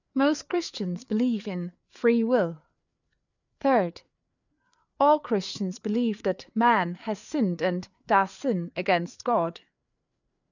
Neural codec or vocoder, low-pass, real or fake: codec, 16 kHz, 4 kbps, FreqCodec, larger model; 7.2 kHz; fake